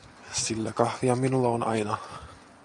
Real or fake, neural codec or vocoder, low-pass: real; none; 10.8 kHz